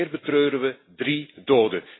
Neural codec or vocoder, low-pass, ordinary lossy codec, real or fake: none; 7.2 kHz; AAC, 16 kbps; real